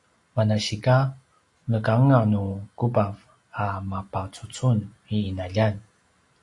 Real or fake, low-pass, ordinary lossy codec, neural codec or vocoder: real; 10.8 kHz; AAC, 48 kbps; none